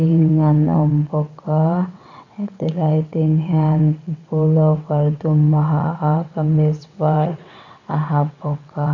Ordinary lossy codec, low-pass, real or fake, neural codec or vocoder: none; 7.2 kHz; fake; vocoder, 22.05 kHz, 80 mel bands, WaveNeXt